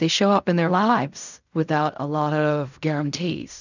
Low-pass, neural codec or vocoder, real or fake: 7.2 kHz; codec, 16 kHz in and 24 kHz out, 0.4 kbps, LongCat-Audio-Codec, fine tuned four codebook decoder; fake